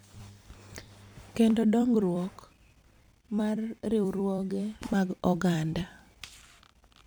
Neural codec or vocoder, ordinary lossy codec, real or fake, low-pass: vocoder, 44.1 kHz, 128 mel bands every 256 samples, BigVGAN v2; none; fake; none